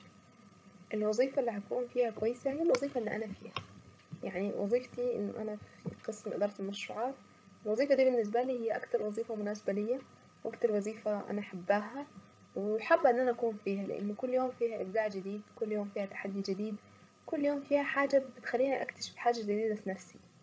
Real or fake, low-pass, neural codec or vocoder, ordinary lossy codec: fake; none; codec, 16 kHz, 16 kbps, FreqCodec, larger model; none